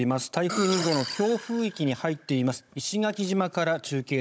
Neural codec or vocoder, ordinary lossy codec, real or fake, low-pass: codec, 16 kHz, 16 kbps, FunCodec, trained on Chinese and English, 50 frames a second; none; fake; none